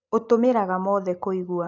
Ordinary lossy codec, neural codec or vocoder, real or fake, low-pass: none; none; real; 7.2 kHz